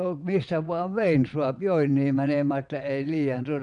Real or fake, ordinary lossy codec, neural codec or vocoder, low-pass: fake; none; vocoder, 22.05 kHz, 80 mel bands, WaveNeXt; 9.9 kHz